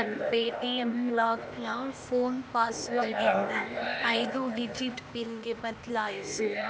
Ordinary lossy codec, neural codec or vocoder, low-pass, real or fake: none; codec, 16 kHz, 0.8 kbps, ZipCodec; none; fake